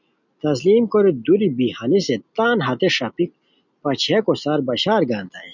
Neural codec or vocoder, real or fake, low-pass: none; real; 7.2 kHz